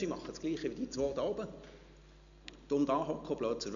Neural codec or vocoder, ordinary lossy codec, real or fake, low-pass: none; none; real; 7.2 kHz